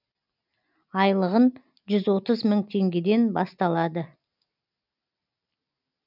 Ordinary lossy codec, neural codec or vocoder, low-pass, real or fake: none; none; 5.4 kHz; real